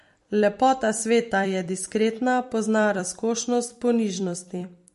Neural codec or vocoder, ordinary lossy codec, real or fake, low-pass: vocoder, 44.1 kHz, 128 mel bands every 256 samples, BigVGAN v2; MP3, 48 kbps; fake; 14.4 kHz